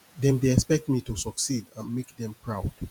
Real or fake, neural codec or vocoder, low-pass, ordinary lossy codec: fake; vocoder, 48 kHz, 128 mel bands, Vocos; none; none